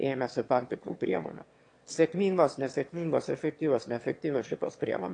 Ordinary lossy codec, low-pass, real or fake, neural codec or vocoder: AAC, 48 kbps; 9.9 kHz; fake; autoencoder, 22.05 kHz, a latent of 192 numbers a frame, VITS, trained on one speaker